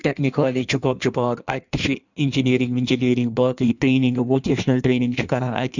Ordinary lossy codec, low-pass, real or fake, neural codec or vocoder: none; 7.2 kHz; fake; codec, 16 kHz in and 24 kHz out, 1.1 kbps, FireRedTTS-2 codec